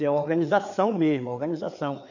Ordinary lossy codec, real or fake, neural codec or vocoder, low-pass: none; fake; codec, 16 kHz, 4 kbps, FreqCodec, larger model; 7.2 kHz